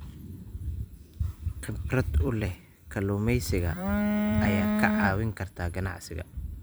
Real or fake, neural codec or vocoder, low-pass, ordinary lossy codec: fake; vocoder, 44.1 kHz, 128 mel bands every 256 samples, BigVGAN v2; none; none